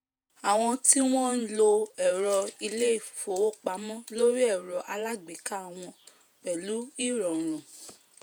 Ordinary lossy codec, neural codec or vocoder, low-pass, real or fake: none; vocoder, 48 kHz, 128 mel bands, Vocos; none; fake